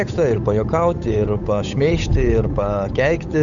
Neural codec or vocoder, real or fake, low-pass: codec, 16 kHz, 8 kbps, FunCodec, trained on Chinese and English, 25 frames a second; fake; 7.2 kHz